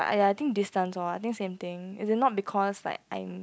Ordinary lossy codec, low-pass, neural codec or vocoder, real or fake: none; none; none; real